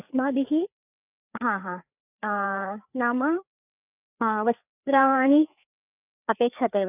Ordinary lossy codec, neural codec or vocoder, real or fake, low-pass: none; codec, 16 kHz, 4 kbps, FreqCodec, larger model; fake; 3.6 kHz